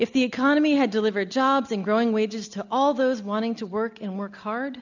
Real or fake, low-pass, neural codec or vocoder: real; 7.2 kHz; none